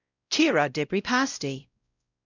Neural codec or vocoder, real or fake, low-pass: codec, 16 kHz, 0.5 kbps, X-Codec, WavLM features, trained on Multilingual LibriSpeech; fake; 7.2 kHz